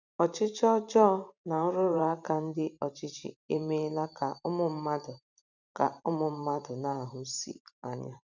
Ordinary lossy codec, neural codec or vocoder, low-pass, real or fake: none; vocoder, 44.1 kHz, 128 mel bands every 512 samples, BigVGAN v2; 7.2 kHz; fake